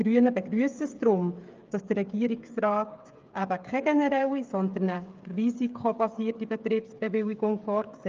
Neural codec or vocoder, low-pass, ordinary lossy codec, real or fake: codec, 16 kHz, 8 kbps, FreqCodec, smaller model; 7.2 kHz; Opus, 24 kbps; fake